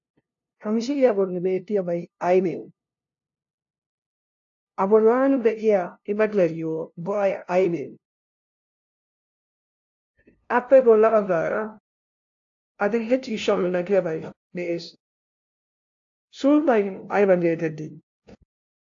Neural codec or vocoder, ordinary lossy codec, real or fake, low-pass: codec, 16 kHz, 0.5 kbps, FunCodec, trained on LibriTTS, 25 frames a second; AAC, 48 kbps; fake; 7.2 kHz